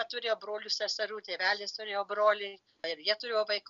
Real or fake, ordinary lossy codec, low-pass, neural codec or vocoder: real; MP3, 96 kbps; 7.2 kHz; none